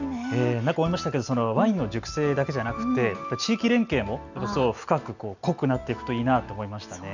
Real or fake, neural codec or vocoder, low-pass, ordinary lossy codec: real; none; 7.2 kHz; none